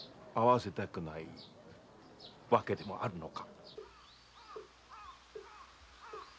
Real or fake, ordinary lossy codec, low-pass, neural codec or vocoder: real; none; none; none